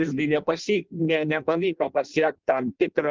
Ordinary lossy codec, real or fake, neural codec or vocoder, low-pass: Opus, 24 kbps; fake; codec, 16 kHz in and 24 kHz out, 0.6 kbps, FireRedTTS-2 codec; 7.2 kHz